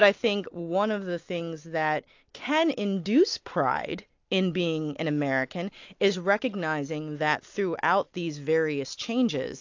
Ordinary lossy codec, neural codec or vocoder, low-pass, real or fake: AAC, 48 kbps; none; 7.2 kHz; real